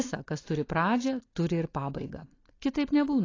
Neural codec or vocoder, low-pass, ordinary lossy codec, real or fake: none; 7.2 kHz; AAC, 32 kbps; real